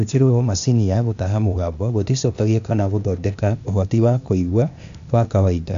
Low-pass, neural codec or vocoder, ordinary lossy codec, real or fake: 7.2 kHz; codec, 16 kHz, 0.8 kbps, ZipCodec; MP3, 64 kbps; fake